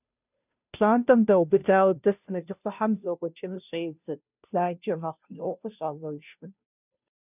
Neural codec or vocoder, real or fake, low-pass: codec, 16 kHz, 0.5 kbps, FunCodec, trained on Chinese and English, 25 frames a second; fake; 3.6 kHz